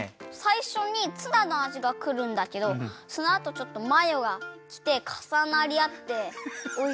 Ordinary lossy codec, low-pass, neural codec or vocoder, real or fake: none; none; none; real